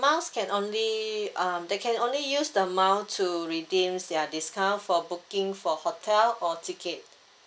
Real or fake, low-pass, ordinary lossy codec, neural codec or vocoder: real; none; none; none